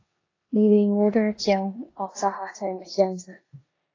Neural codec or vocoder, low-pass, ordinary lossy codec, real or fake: codec, 16 kHz in and 24 kHz out, 0.9 kbps, LongCat-Audio-Codec, four codebook decoder; 7.2 kHz; AAC, 32 kbps; fake